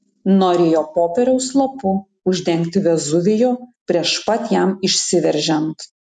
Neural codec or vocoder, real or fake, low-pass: none; real; 10.8 kHz